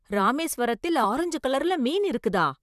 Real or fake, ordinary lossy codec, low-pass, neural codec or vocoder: fake; none; 14.4 kHz; vocoder, 44.1 kHz, 128 mel bands, Pupu-Vocoder